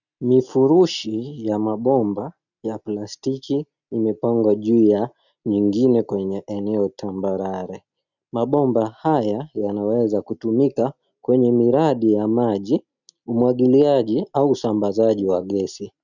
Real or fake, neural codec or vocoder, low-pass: real; none; 7.2 kHz